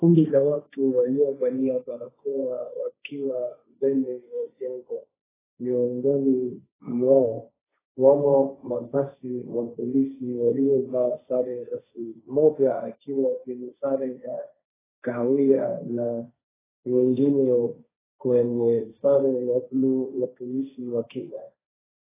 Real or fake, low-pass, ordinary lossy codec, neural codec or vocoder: fake; 3.6 kHz; AAC, 16 kbps; codec, 16 kHz, 1.1 kbps, Voila-Tokenizer